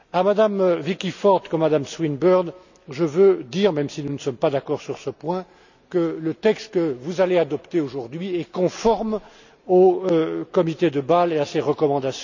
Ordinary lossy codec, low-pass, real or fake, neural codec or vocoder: none; 7.2 kHz; real; none